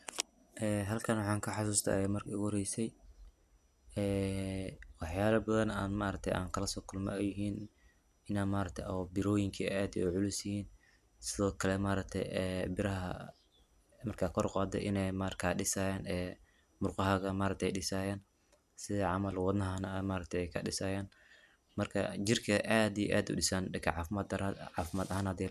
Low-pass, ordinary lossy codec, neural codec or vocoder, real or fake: 14.4 kHz; none; none; real